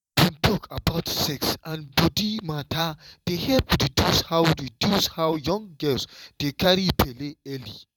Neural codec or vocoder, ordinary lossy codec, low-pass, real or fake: vocoder, 44.1 kHz, 128 mel bands every 512 samples, BigVGAN v2; none; 19.8 kHz; fake